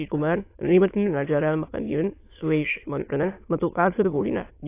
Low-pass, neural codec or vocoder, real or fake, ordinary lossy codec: 3.6 kHz; autoencoder, 22.05 kHz, a latent of 192 numbers a frame, VITS, trained on many speakers; fake; AAC, 24 kbps